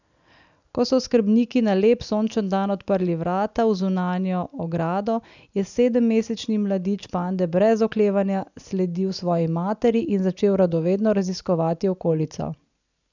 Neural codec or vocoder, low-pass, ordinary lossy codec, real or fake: none; 7.2 kHz; none; real